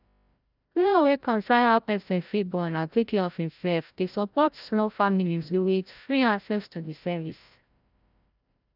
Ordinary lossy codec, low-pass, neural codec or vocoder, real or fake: none; 5.4 kHz; codec, 16 kHz, 0.5 kbps, FreqCodec, larger model; fake